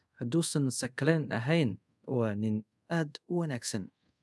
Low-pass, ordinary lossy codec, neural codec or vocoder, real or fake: none; none; codec, 24 kHz, 0.5 kbps, DualCodec; fake